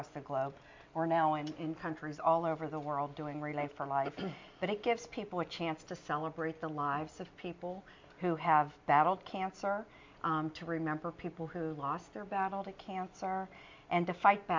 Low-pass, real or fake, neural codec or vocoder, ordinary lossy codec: 7.2 kHz; real; none; MP3, 64 kbps